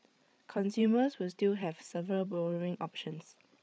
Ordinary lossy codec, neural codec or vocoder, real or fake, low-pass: none; codec, 16 kHz, 8 kbps, FreqCodec, larger model; fake; none